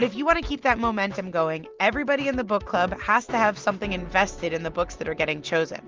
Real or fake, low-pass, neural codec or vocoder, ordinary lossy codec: real; 7.2 kHz; none; Opus, 32 kbps